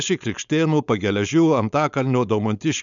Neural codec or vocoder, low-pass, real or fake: codec, 16 kHz, 4.8 kbps, FACodec; 7.2 kHz; fake